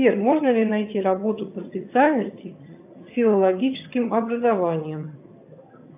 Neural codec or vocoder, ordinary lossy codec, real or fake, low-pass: vocoder, 22.05 kHz, 80 mel bands, HiFi-GAN; AAC, 32 kbps; fake; 3.6 kHz